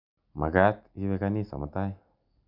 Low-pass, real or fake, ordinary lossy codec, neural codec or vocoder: 5.4 kHz; real; none; none